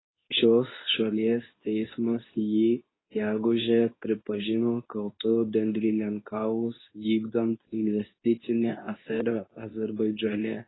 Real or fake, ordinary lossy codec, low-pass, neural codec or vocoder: fake; AAC, 16 kbps; 7.2 kHz; codec, 24 kHz, 0.9 kbps, WavTokenizer, medium speech release version 2